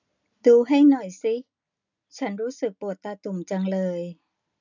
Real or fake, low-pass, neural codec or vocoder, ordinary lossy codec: real; 7.2 kHz; none; none